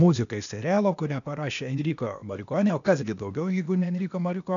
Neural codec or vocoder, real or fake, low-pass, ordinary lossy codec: codec, 16 kHz, 0.8 kbps, ZipCodec; fake; 7.2 kHz; MP3, 96 kbps